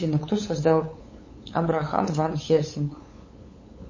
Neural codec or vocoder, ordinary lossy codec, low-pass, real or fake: codec, 16 kHz, 8 kbps, FunCodec, trained on LibriTTS, 25 frames a second; MP3, 32 kbps; 7.2 kHz; fake